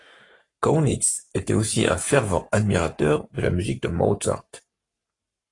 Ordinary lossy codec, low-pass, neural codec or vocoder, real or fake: AAC, 32 kbps; 10.8 kHz; codec, 44.1 kHz, 7.8 kbps, Pupu-Codec; fake